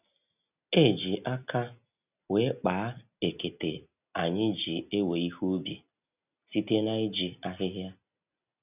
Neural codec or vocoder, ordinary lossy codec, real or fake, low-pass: none; none; real; 3.6 kHz